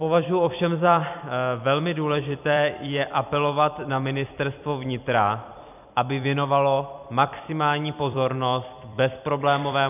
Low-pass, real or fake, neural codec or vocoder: 3.6 kHz; fake; vocoder, 44.1 kHz, 128 mel bands every 256 samples, BigVGAN v2